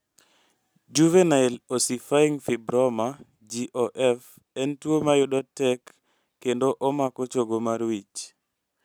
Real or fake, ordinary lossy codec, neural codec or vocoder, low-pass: real; none; none; none